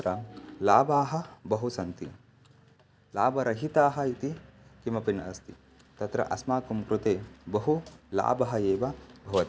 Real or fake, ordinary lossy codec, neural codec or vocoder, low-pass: real; none; none; none